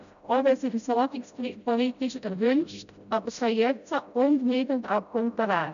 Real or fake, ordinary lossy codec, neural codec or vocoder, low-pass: fake; none; codec, 16 kHz, 0.5 kbps, FreqCodec, smaller model; 7.2 kHz